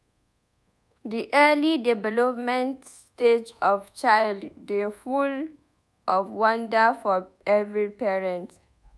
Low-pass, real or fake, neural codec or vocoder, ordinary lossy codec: none; fake; codec, 24 kHz, 1.2 kbps, DualCodec; none